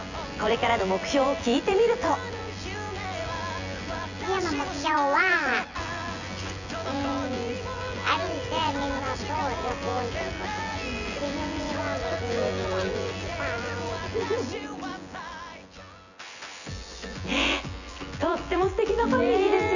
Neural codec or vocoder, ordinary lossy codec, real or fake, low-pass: vocoder, 24 kHz, 100 mel bands, Vocos; none; fake; 7.2 kHz